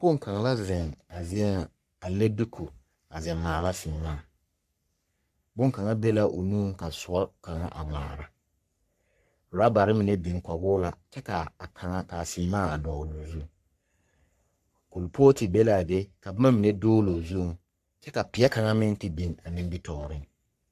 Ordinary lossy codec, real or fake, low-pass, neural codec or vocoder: AAC, 96 kbps; fake; 14.4 kHz; codec, 44.1 kHz, 3.4 kbps, Pupu-Codec